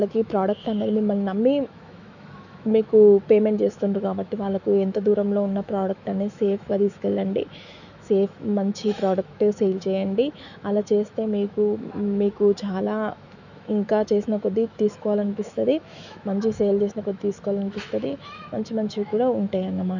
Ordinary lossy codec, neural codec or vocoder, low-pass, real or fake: none; autoencoder, 48 kHz, 128 numbers a frame, DAC-VAE, trained on Japanese speech; 7.2 kHz; fake